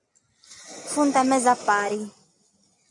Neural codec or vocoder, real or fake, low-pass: none; real; 10.8 kHz